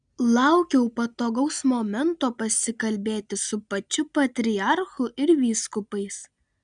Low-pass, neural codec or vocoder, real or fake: 9.9 kHz; none; real